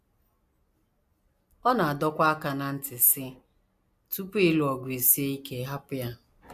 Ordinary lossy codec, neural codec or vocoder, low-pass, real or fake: AAC, 96 kbps; none; 14.4 kHz; real